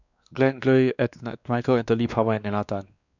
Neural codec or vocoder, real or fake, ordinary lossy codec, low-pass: codec, 16 kHz, 2 kbps, X-Codec, WavLM features, trained on Multilingual LibriSpeech; fake; none; 7.2 kHz